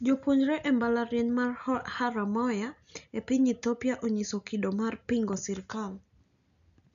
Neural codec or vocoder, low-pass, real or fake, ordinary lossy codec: none; 7.2 kHz; real; none